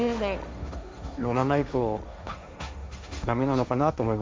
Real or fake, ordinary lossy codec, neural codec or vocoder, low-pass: fake; none; codec, 16 kHz, 1.1 kbps, Voila-Tokenizer; none